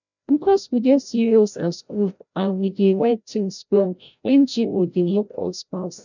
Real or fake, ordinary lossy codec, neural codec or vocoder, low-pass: fake; none; codec, 16 kHz, 0.5 kbps, FreqCodec, larger model; 7.2 kHz